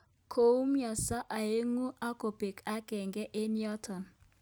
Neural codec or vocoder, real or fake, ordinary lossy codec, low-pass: none; real; none; none